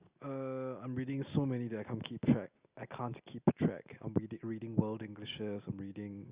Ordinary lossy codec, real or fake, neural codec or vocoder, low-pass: Opus, 64 kbps; real; none; 3.6 kHz